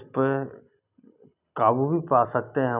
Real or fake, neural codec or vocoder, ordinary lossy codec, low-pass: real; none; none; 3.6 kHz